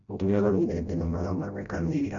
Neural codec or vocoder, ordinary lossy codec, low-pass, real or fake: codec, 16 kHz, 0.5 kbps, FreqCodec, smaller model; none; 7.2 kHz; fake